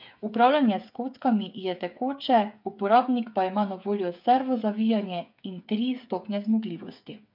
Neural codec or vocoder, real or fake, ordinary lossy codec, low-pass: codec, 16 kHz, 8 kbps, FreqCodec, smaller model; fake; MP3, 48 kbps; 5.4 kHz